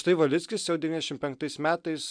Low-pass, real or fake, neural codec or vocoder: 9.9 kHz; real; none